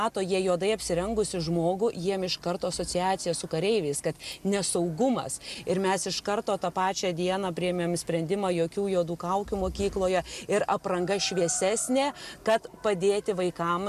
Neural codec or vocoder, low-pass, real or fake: none; 14.4 kHz; real